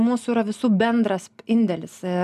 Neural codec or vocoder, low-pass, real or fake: none; 14.4 kHz; real